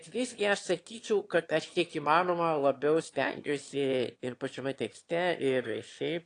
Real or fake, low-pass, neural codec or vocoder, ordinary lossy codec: fake; 9.9 kHz; autoencoder, 22.05 kHz, a latent of 192 numbers a frame, VITS, trained on one speaker; AAC, 48 kbps